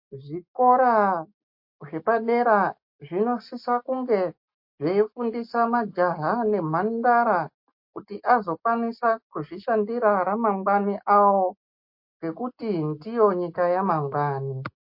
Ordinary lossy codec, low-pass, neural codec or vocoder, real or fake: MP3, 32 kbps; 5.4 kHz; none; real